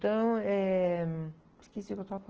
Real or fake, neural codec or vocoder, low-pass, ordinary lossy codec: real; none; 7.2 kHz; Opus, 16 kbps